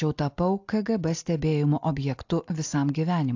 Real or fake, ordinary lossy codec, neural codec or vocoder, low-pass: real; AAC, 48 kbps; none; 7.2 kHz